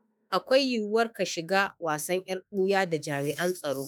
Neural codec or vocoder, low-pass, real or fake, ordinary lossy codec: autoencoder, 48 kHz, 32 numbers a frame, DAC-VAE, trained on Japanese speech; none; fake; none